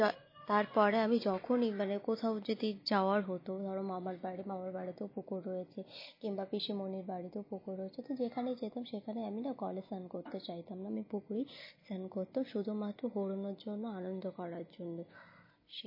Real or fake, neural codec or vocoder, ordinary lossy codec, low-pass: real; none; MP3, 24 kbps; 5.4 kHz